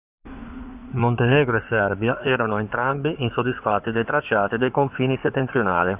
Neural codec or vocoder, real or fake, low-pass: codec, 16 kHz in and 24 kHz out, 2.2 kbps, FireRedTTS-2 codec; fake; 3.6 kHz